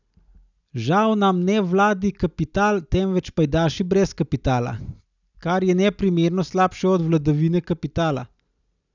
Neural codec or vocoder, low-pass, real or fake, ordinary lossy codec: none; 7.2 kHz; real; none